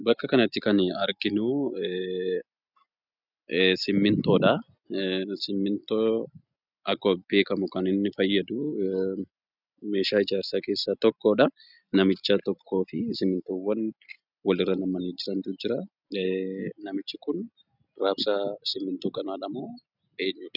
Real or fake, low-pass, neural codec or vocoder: real; 5.4 kHz; none